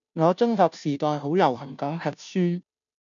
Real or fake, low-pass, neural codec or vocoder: fake; 7.2 kHz; codec, 16 kHz, 0.5 kbps, FunCodec, trained on Chinese and English, 25 frames a second